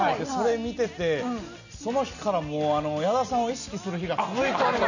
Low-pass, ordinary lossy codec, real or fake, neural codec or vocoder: 7.2 kHz; none; real; none